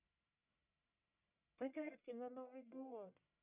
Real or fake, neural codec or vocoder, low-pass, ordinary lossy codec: fake; codec, 44.1 kHz, 1.7 kbps, Pupu-Codec; 3.6 kHz; none